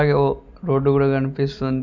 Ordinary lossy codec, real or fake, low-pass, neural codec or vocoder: none; real; 7.2 kHz; none